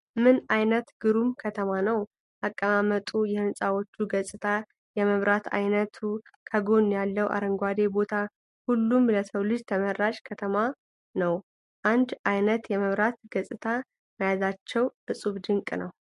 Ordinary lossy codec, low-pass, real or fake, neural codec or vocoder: MP3, 48 kbps; 14.4 kHz; real; none